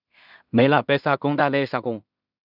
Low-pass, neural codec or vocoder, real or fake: 5.4 kHz; codec, 16 kHz in and 24 kHz out, 0.4 kbps, LongCat-Audio-Codec, two codebook decoder; fake